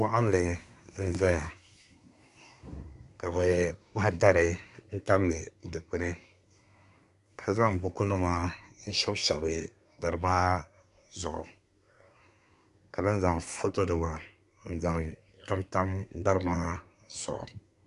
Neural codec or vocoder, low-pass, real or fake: codec, 24 kHz, 1 kbps, SNAC; 10.8 kHz; fake